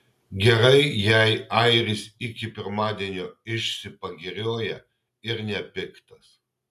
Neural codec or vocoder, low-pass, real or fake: none; 14.4 kHz; real